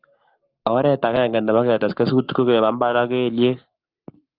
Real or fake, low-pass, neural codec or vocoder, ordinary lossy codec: fake; 5.4 kHz; codec, 44.1 kHz, 7.8 kbps, Pupu-Codec; Opus, 24 kbps